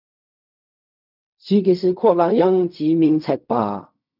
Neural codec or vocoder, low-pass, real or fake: codec, 16 kHz in and 24 kHz out, 0.4 kbps, LongCat-Audio-Codec, fine tuned four codebook decoder; 5.4 kHz; fake